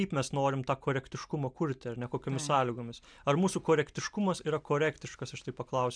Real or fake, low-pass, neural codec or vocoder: real; 9.9 kHz; none